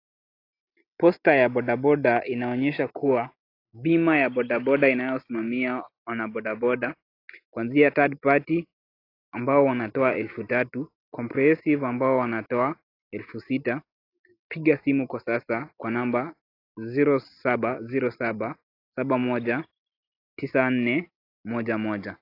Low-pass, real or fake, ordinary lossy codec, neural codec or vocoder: 5.4 kHz; real; AAC, 32 kbps; none